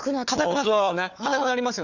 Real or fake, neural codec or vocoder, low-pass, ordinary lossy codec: fake; codec, 16 kHz, 4 kbps, FunCodec, trained on Chinese and English, 50 frames a second; 7.2 kHz; none